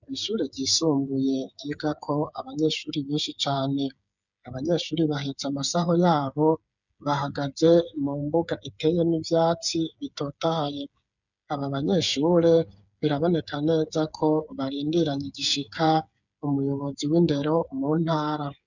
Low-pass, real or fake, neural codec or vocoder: 7.2 kHz; fake; codec, 16 kHz, 8 kbps, FreqCodec, smaller model